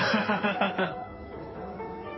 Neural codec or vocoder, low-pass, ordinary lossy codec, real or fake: codec, 16 kHz in and 24 kHz out, 2.2 kbps, FireRedTTS-2 codec; 7.2 kHz; MP3, 24 kbps; fake